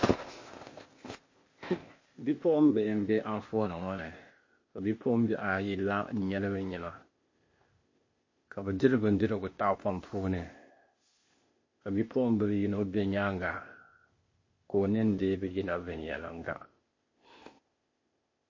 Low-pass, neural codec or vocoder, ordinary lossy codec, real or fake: 7.2 kHz; codec, 16 kHz, 0.8 kbps, ZipCodec; MP3, 32 kbps; fake